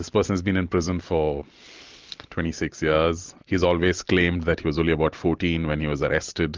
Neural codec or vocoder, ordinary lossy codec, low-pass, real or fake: none; Opus, 24 kbps; 7.2 kHz; real